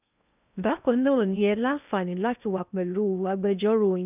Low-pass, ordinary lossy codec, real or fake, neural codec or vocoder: 3.6 kHz; none; fake; codec, 16 kHz in and 24 kHz out, 0.6 kbps, FocalCodec, streaming, 2048 codes